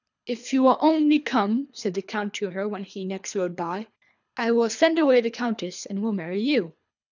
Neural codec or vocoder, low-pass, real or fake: codec, 24 kHz, 3 kbps, HILCodec; 7.2 kHz; fake